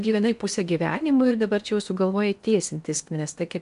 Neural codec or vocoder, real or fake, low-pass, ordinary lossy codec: codec, 16 kHz in and 24 kHz out, 0.8 kbps, FocalCodec, streaming, 65536 codes; fake; 10.8 kHz; MP3, 96 kbps